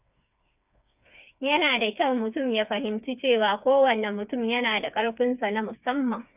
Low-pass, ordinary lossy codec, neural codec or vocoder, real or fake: 3.6 kHz; none; codec, 16 kHz, 4 kbps, FreqCodec, smaller model; fake